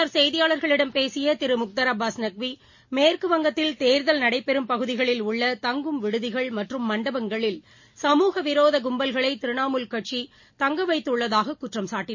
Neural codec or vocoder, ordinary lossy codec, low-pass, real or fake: none; MP3, 32 kbps; 7.2 kHz; real